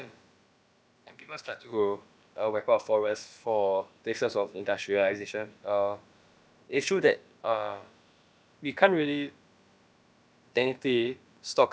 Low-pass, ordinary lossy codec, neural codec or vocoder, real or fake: none; none; codec, 16 kHz, about 1 kbps, DyCAST, with the encoder's durations; fake